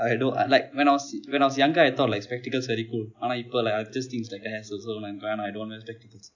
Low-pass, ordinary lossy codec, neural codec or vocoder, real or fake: 7.2 kHz; none; none; real